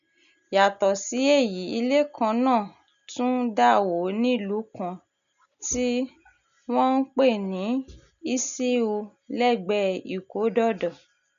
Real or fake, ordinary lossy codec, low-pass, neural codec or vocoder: real; none; 7.2 kHz; none